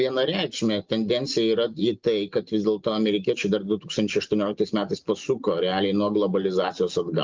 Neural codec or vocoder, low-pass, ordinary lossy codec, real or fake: none; 7.2 kHz; Opus, 24 kbps; real